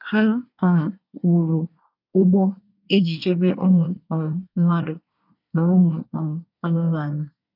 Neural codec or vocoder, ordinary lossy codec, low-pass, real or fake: codec, 24 kHz, 1 kbps, SNAC; none; 5.4 kHz; fake